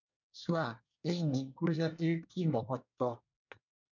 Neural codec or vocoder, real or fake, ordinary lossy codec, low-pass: codec, 24 kHz, 1 kbps, SNAC; fake; MP3, 64 kbps; 7.2 kHz